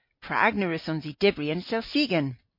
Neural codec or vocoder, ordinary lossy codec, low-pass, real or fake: none; MP3, 32 kbps; 5.4 kHz; real